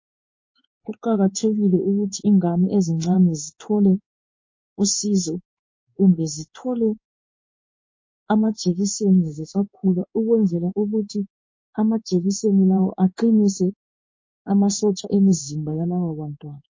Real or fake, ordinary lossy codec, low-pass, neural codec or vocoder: fake; MP3, 32 kbps; 7.2 kHz; codec, 16 kHz in and 24 kHz out, 1 kbps, XY-Tokenizer